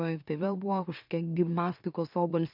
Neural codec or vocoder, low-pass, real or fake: autoencoder, 44.1 kHz, a latent of 192 numbers a frame, MeloTTS; 5.4 kHz; fake